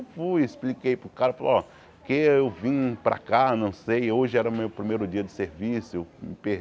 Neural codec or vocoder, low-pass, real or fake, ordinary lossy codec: none; none; real; none